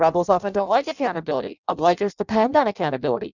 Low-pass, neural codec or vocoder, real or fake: 7.2 kHz; codec, 16 kHz in and 24 kHz out, 0.6 kbps, FireRedTTS-2 codec; fake